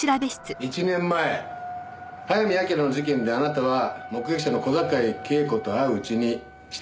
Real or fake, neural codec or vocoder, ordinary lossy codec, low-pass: real; none; none; none